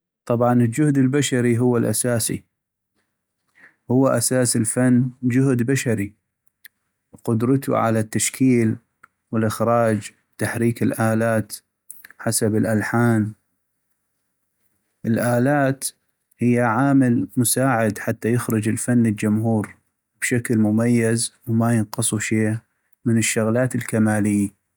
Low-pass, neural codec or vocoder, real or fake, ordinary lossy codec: none; none; real; none